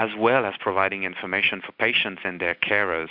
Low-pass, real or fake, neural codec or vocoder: 5.4 kHz; real; none